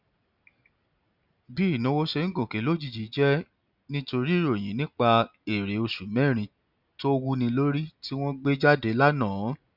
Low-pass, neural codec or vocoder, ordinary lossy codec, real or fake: 5.4 kHz; none; none; real